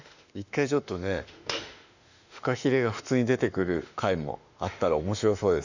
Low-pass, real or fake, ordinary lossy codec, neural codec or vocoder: 7.2 kHz; fake; none; autoencoder, 48 kHz, 32 numbers a frame, DAC-VAE, trained on Japanese speech